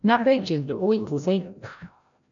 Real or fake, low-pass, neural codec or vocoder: fake; 7.2 kHz; codec, 16 kHz, 0.5 kbps, FreqCodec, larger model